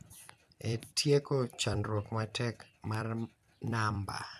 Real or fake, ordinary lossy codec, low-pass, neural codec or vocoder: fake; none; 14.4 kHz; vocoder, 44.1 kHz, 128 mel bands every 256 samples, BigVGAN v2